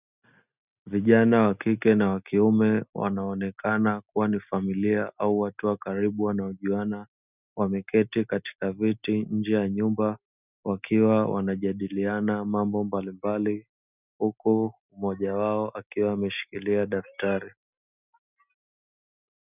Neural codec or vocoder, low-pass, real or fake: none; 3.6 kHz; real